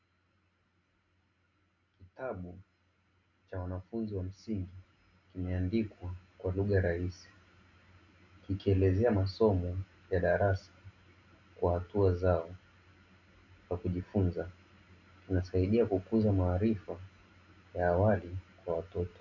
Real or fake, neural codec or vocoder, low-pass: real; none; 7.2 kHz